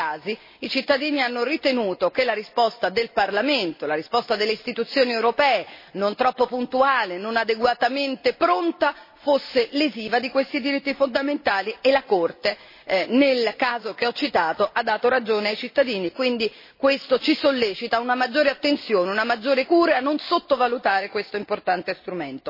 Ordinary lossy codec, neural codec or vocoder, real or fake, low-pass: MP3, 24 kbps; none; real; 5.4 kHz